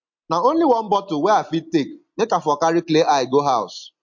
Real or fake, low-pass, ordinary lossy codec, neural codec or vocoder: real; 7.2 kHz; MP3, 48 kbps; none